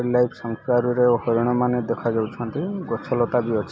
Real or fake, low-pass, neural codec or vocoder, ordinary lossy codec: real; none; none; none